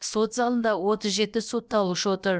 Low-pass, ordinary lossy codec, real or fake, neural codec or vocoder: none; none; fake; codec, 16 kHz, about 1 kbps, DyCAST, with the encoder's durations